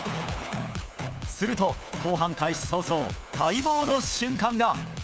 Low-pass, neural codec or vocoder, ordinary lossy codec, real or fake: none; codec, 16 kHz, 4 kbps, FunCodec, trained on LibriTTS, 50 frames a second; none; fake